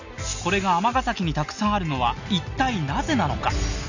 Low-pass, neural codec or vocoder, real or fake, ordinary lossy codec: 7.2 kHz; none; real; none